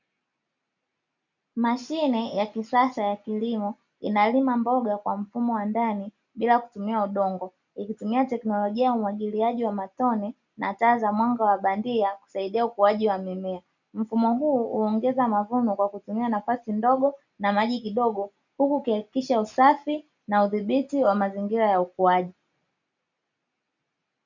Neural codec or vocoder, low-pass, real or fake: none; 7.2 kHz; real